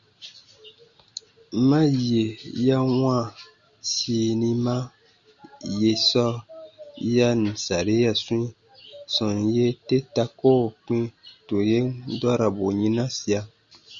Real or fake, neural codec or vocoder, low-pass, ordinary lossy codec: real; none; 7.2 kHz; Opus, 64 kbps